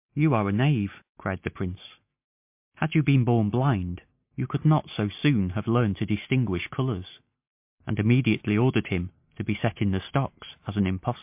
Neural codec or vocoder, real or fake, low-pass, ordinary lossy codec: none; real; 3.6 kHz; MP3, 32 kbps